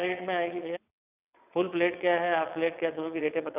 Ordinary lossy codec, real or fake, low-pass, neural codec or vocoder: none; fake; 3.6 kHz; vocoder, 22.05 kHz, 80 mel bands, WaveNeXt